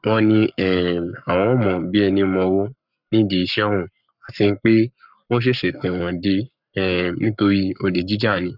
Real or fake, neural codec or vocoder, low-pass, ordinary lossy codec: fake; codec, 44.1 kHz, 7.8 kbps, Pupu-Codec; 5.4 kHz; none